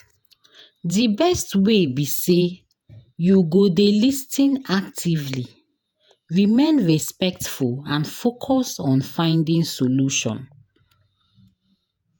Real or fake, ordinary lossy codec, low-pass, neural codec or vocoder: fake; none; none; vocoder, 48 kHz, 128 mel bands, Vocos